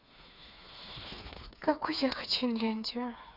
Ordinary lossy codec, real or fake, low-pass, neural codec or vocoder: none; fake; 5.4 kHz; codec, 16 kHz, 4 kbps, FreqCodec, smaller model